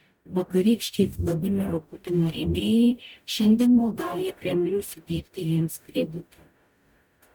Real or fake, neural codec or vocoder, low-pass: fake; codec, 44.1 kHz, 0.9 kbps, DAC; 19.8 kHz